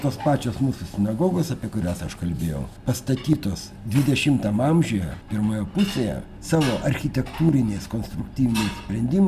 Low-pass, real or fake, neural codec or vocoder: 14.4 kHz; real; none